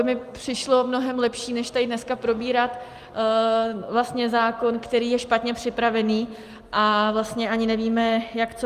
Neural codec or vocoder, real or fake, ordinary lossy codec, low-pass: none; real; Opus, 32 kbps; 14.4 kHz